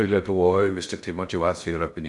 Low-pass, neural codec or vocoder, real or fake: 10.8 kHz; codec, 16 kHz in and 24 kHz out, 0.6 kbps, FocalCodec, streaming, 2048 codes; fake